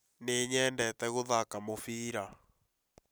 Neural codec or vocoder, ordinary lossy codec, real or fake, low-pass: none; none; real; none